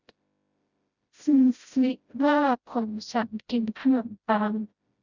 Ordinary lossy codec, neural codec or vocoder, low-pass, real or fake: Opus, 64 kbps; codec, 16 kHz, 0.5 kbps, FreqCodec, smaller model; 7.2 kHz; fake